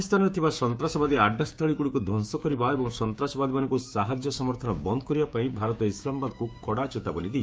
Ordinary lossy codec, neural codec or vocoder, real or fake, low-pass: none; codec, 16 kHz, 6 kbps, DAC; fake; none